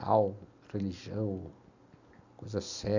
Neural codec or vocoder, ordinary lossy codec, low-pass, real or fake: none; AAC, 48 kbps; 7.2 kHz; real